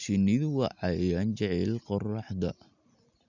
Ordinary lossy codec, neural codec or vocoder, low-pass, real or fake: none; none; 7.2 kHz; real